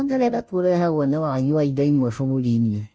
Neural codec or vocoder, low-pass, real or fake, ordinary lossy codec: codec, 16 kHz, 0.5 kbps, FunCodec, trained on Chinese and English, 25 frames a second; none; fake; none